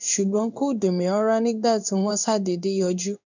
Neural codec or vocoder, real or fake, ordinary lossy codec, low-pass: codec, 16 kHz in and 24 kHz out, 1 kbps, XY-Tokenizer; fake; none; 7.2 kHz